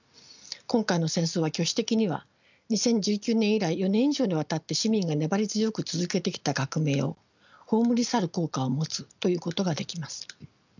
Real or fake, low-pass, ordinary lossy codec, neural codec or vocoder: real; 7.2 kHz; none; none